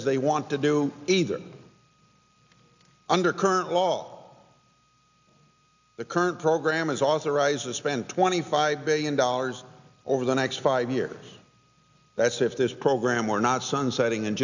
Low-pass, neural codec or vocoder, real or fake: 7.2 kHz; none; real